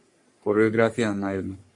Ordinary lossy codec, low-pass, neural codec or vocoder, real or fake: MP3, 48 kbps; 10.8 kHz; codec, 44.1 kHz, 3.4 kbps, Pupu-Codec; fake